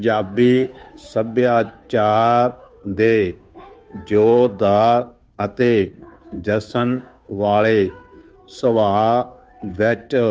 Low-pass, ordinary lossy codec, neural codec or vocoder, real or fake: none; none; codec, 16 kHz, 2 kbps, FunCodec, trained on Chinese and English, 25 frames a second; fake